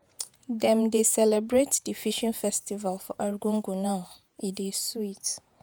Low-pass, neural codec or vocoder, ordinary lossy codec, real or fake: none; vocoder, 48 kHz, 128 mel bands, Vocos; none; fake